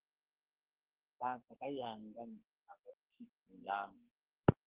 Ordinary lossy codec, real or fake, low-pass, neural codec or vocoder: Opus, 16 kbps; fake; 3.6 kHz; codec, 44.1 kHz, 2.6 kbps, SNAC